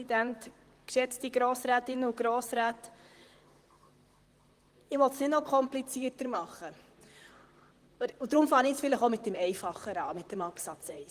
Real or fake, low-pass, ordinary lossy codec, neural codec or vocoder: fake; 14.4 kHz; Opus, 24 kbps; vocoder, 44.1 kHz, 128 mel bands, Pupu-Vocoder